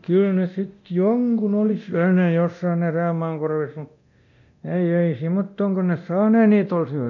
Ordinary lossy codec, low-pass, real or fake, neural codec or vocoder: none; 7.2 kHz; fake; codec, 24 kHz, 0.9 kbps, DualCodec